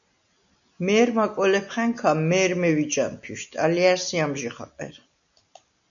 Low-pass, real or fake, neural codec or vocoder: 7.2 kHz; real; none